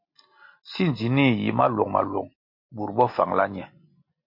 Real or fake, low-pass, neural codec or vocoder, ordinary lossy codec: real; 5.4 kHz; none; MP3, 32 kbps